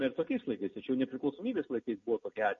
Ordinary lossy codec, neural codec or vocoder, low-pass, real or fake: MP3, 32 kbps; none; 7.2 kHz; real